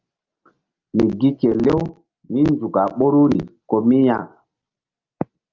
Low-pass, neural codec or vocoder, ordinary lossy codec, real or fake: 7.2 kHz; none; Opus, 24 kbps; real